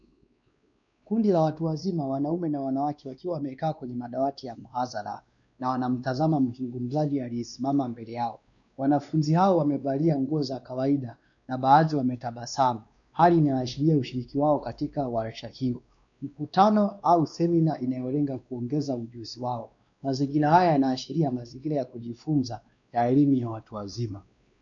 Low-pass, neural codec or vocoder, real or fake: 7.2 kHz; codec, 16 kHz, 2 kbps, X-Codec, WavLM features, trained on Multilingual LibriSpeech; fake